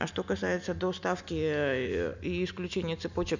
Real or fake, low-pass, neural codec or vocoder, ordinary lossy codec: real; 7.2 kHz; none; none